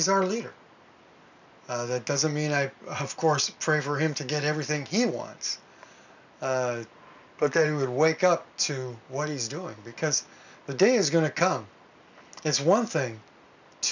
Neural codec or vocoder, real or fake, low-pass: none; real; 7.2 kHz